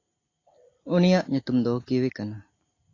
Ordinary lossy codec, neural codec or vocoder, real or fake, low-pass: AAC, 32 kbps; none; real; 7.2 kHz